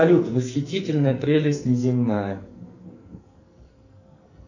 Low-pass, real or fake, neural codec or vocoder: 7.2 kHz; fake; codec, 44.1 kHz, 2.6 kbps, SNAC